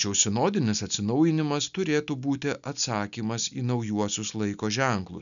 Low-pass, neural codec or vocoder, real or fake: 7.2 kHz; none; real